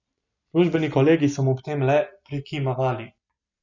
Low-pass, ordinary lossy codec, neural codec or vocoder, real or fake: 7.2 kHz; none; none; real